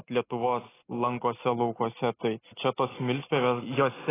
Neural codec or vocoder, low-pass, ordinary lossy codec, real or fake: none; 3.6 kHz; AAC, 16 kbps; real